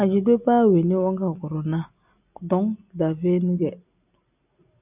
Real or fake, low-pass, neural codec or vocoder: real; 3.6 kHz; none